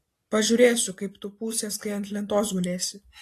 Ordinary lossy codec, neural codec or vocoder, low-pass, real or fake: AAC, 48 kbps; none; 14.4 kHz; real